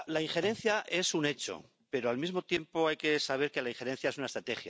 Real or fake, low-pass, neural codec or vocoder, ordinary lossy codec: real; none; none; none